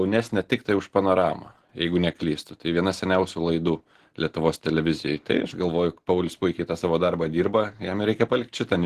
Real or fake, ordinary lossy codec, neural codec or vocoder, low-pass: real; Opus, 16 kbps; none; 14.4 kHz